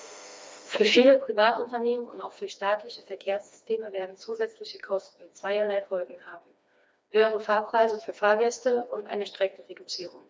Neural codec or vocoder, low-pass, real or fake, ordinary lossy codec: codec, 16 kHz, 2 kbps, FreqCodec, smaller model; none; fake; none